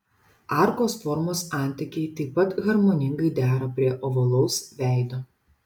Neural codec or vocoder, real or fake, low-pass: none; real; 19.8 kHz